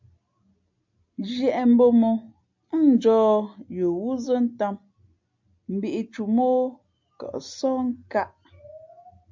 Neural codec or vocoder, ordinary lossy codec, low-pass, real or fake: none; MP3, 64 kbps; 7.2 kHz; real